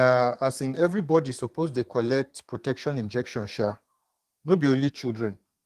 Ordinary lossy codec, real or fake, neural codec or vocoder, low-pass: Opus, 24 kbps; fake; codec, 32 kHz, 1.9 kbps, SNAC; 14.4 kHz